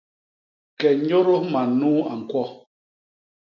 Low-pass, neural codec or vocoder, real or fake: 7.2 kHz; none; real